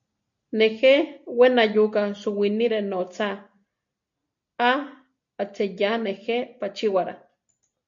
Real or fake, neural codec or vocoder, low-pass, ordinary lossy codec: real; none; 7.2 kHz; MP3, 64 kbps